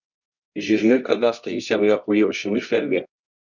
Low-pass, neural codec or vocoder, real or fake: 7.2 kHz; codec, 24 kHz, 0.9 kbps, WavTokenizer, medium music audio release; fake